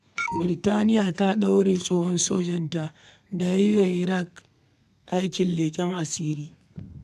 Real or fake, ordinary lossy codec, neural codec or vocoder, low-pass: fake; none; codec, 32 kHz, 1.9 kbps, SNAC; 14.4 kHz